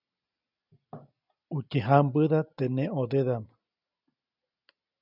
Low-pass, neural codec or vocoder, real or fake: 5.4 kHz; none; real